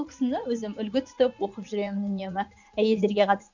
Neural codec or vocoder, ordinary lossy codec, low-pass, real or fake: vocoder, 44.1 kHz, 80 mel bands, Vocos; none; 7.2 kHz; fake